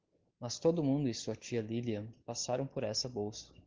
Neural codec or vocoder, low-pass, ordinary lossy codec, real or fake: none; 7.2 kHz; Opus, 16 kbps; real